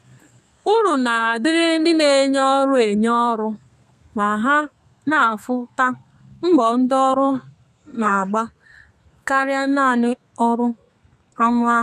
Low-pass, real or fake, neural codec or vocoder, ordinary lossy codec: 14.4 kHz; fake; codec, 32 kHz, 1.9 kbps, SNAC; none